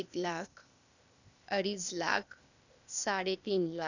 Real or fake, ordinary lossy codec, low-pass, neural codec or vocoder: fake; none; 7.2 kHz; codec, 16 kHz, 0.8 kbps, ZipCodec